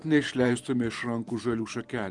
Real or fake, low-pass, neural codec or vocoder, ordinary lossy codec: fake; 10.8 kHz; vocoder, 44.1 kHz, 128 mel bands every 512 samples, BigVGAN v2; Opus, 24 kbps